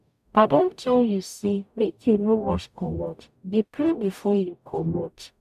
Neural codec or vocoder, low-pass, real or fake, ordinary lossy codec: codec, 44.1 kHz, 0.9 kbps, DAC; 14.4 kHz; fake; none